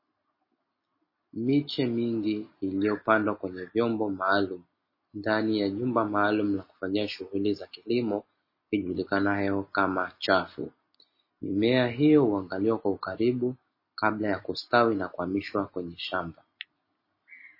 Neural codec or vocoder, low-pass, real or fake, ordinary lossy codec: none; 5.4 kHz; real; MP3, 24 kbps